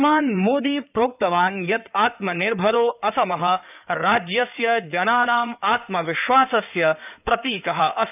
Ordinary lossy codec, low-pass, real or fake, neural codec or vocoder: none; 3.6 kHz; fake; codec, 16 kHz in and 24 kHz out, 2.2 kbps, FireRedTTS-2 codec